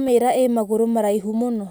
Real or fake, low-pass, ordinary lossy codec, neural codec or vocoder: real; none; none; none